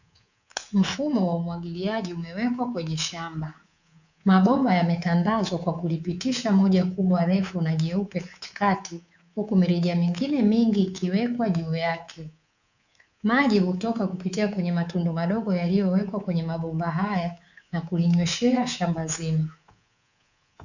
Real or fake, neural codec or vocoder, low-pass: fake; codec, 24 kHz, 3.1 kbps, DualCodec; 7.2 kHz